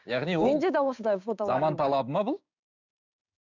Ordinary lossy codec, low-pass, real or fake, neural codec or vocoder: none; 7.2 kHz; fake; codec, 16 kHz, 6 kbps, DAC